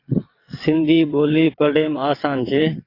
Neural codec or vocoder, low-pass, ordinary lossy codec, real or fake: vocoder, 22.05 kHz, 80 mel bands, WaveNeXt; 5.4 kHz; AAC, 32 kbps; fake